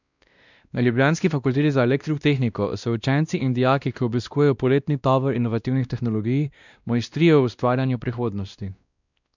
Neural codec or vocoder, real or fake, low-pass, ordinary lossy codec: codec, 16 kHz, 1 kbps, X-Codec, WavLM features, trained on Multilingual LibriSpeech; fake; 7.2 kHz; none